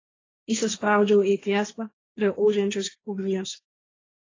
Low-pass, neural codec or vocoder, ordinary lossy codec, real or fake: 7.2 kHz; codec, 16 kHz, 1.1 kbps, Voila-Tokenizer; AAC, 32 kbps; fake